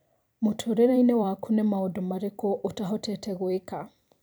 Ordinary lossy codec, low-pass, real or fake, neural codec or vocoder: none; none; fake; vocoder, 44.1 kHz, 128 mel bands every 256 samples, BigVGAN v2